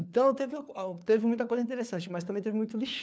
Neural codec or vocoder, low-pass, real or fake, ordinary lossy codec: codec, 16 kHz, 4 kbps, FunCodec, trained on LibriTTS, 50 frames a second; none; fake; none